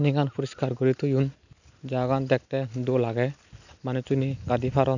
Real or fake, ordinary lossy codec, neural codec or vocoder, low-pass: real; none; none; 7.2 kHz